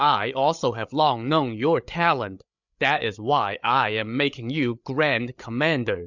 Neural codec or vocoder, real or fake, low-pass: codec, 16 kHz, 8 kbps, FreqCodec, larger model; fake; 7.2 kHz